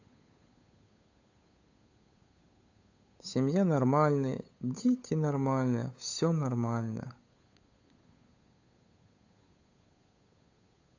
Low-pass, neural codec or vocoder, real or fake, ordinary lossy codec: 7.2 kHz; codec, 16 kHz, 8 kbps, FunCodec, trained on Chinese and English, 25 frames a second; fake; AAC, 48 kbps